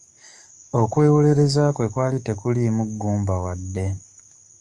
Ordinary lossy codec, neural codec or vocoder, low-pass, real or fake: Opus, 32 kbps; codec, 44.1 kHz, 7.8 kbps, DAC; 10.8 kHz; fake